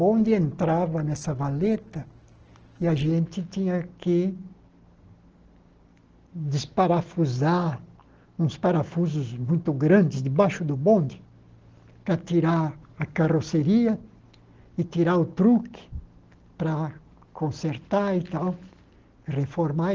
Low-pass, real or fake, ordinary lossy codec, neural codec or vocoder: 7.2 kHz; real; Opus, 16 kbps; none